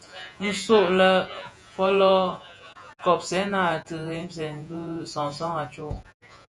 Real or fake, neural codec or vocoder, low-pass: fake; vocoder, 48 kHz, 128 mel bands, Vocos; 10.8 kHz